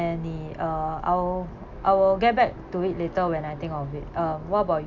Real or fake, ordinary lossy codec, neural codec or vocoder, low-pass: real; none; none; 7.2 kHz